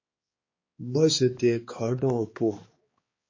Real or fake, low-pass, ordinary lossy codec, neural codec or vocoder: fake; 7.2 kHz; MP3, 32 kbps; codec, 16 kHz, 2 kbps, X-Codec, HuBERT features, trained on balanced general audio